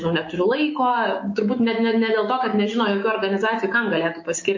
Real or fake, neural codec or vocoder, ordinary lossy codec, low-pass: fake; autoencoder, 48 kHz, 128 numbers a frame, DAC-VAE, trained on Japanese speech; MP3, 32 kbps; 7.2 kHz